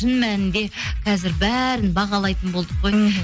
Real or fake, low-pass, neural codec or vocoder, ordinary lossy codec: real; none; none; none